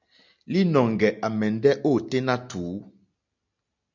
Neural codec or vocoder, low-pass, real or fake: none; 7.2 kHz; real